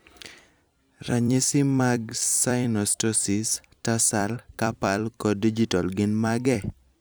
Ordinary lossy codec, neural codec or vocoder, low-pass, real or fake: none; vocoder, 44.1 kHz, 128 mel bands every 256 samples, BigVGAN v2; none; fake